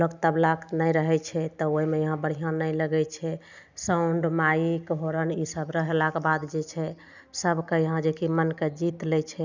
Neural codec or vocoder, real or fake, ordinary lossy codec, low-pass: none; real; none; 7.2 kHz